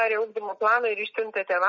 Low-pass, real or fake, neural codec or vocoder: 7.2 kHz; real; none